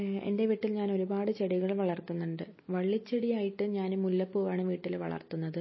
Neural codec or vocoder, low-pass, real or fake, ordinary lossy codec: none; 7.2 kHz; real; MP3, 24 kbps